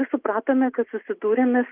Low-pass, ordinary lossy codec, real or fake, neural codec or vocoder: 3.6 kHz; Opus, 32 kbps; real; none